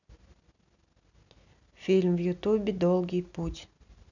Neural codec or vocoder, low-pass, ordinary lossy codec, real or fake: none; 7.2 kHz; none; real